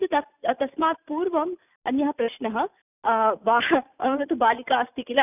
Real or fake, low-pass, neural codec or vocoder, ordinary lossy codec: real; 3.6 kHz; none; none